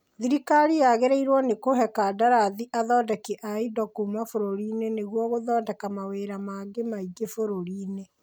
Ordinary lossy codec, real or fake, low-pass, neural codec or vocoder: none; real; none; none